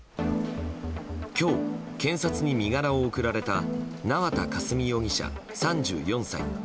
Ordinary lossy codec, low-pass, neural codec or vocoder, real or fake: none; none; none; real